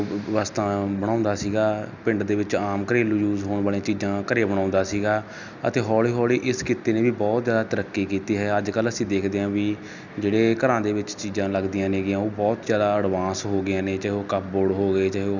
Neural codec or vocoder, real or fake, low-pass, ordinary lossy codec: none; real; 7.2 kHz; none